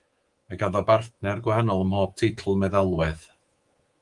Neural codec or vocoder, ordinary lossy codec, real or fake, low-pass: codec, 24 kHz, 3.1 kbps, DualCodec; Opus, 24 kbps; fake; 10.8 kHz